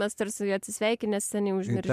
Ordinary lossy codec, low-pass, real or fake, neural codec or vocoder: MP3, 96 kbps; 14.4 kHz; real; none